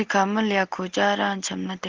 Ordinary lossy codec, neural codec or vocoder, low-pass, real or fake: Opus, 16 kbps; none; 7.2 kHz; real